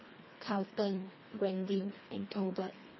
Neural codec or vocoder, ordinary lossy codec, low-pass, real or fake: codec, 24 kHz, 1.5 kbps, HILCodec; MP3, 24 kbps; 7.2 kHz; fake